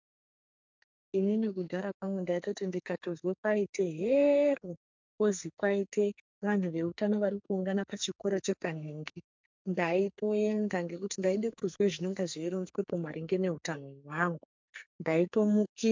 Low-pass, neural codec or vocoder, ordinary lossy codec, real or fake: 7.2 kHz; codec, 44.1 kHz, 2.6 kbps, SNAC; MP3, 64 kbps; fake